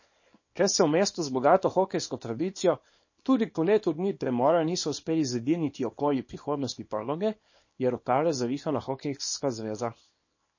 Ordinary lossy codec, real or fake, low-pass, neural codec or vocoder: MP3, 32 kbps; fake; 7.2 kHz; codec, 24 kHz, 0.9 kbps, WavTokenizer, small release